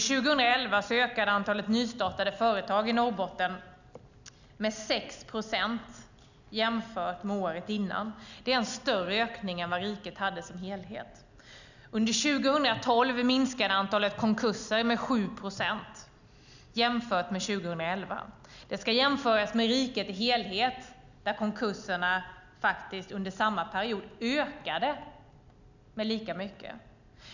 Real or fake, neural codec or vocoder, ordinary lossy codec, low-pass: real; none; none; 7.2 kHz